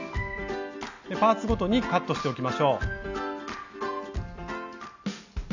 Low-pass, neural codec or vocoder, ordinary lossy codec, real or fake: 7.2 kHz; none; none; real